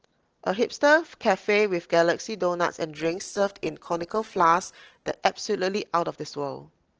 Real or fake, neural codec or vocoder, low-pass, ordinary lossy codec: real; none; 7.2 kHz; Opus, 16 kbps